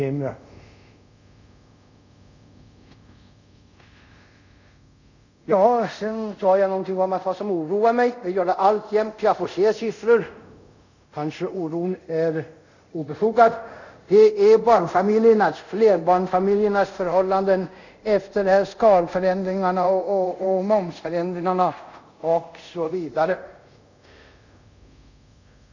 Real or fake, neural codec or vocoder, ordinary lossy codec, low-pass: fake; codec, 24 kHz, 0.5 kbps, DualCodec; none; 7.2 kHz